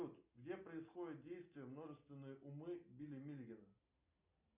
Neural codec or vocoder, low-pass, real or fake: none; 3.6 kHz; real